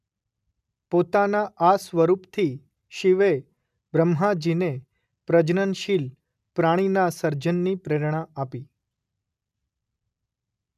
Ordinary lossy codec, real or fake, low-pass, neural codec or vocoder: none; real; 14.4 kHz; none